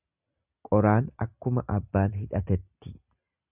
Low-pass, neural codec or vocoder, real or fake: 3.6 kHz; none; real